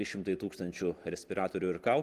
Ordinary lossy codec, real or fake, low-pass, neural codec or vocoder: Opus, 24 kbps; real; 14.4 kHz; none